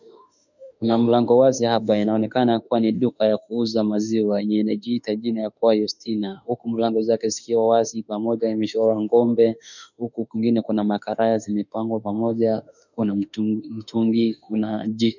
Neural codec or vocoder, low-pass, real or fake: autoencoder, 48 kHz, 32 numbers a frame, DAC-VAE, trained on Japanese speech; 7.2 kHz; fake